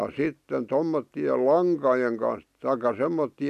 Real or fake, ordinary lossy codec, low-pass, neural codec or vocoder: real; none; 14.4 kHz; none